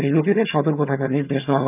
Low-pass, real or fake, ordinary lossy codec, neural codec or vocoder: 3.6 kHz; fake; none; vocoder, 22.05 kHz, 80 mel bands, HiFi-GAN